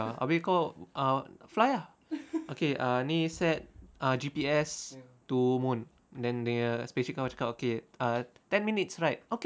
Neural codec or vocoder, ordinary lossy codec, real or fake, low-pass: none; none; real; none